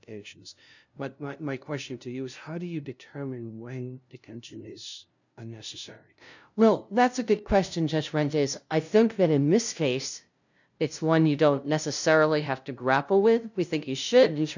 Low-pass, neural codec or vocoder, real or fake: 7.2 kHz; codec, 16 kHz, 0.5 kbps, FunCodec, trained on LibriTTS, 25 frames a second; fake